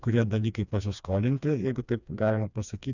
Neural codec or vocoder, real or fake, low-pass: codec, 16 kHz, 2 kbps, FreqCodec, smaller model; fake; 7.2 kHz